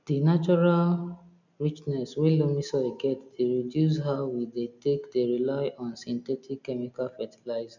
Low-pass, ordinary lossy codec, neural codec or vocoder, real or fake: 7.2 kHz; none; none; real